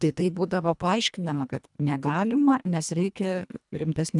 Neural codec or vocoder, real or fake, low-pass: codec, 24 kHz, 1.5 kbps, HILCodec; fake; 10.8 kHz